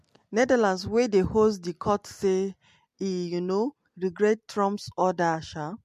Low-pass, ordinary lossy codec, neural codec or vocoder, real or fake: 14.4 kHz; MP3, 64 kbps; none; real